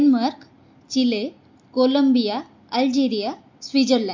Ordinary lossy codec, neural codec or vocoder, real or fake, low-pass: MP3, 48 kbps; none; real; 7.2 kHz